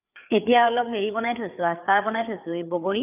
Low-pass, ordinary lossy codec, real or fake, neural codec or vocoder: 3.6 kHz; none; fake; codec, 16 kHz, 4 kbps, FreqCodec, larger model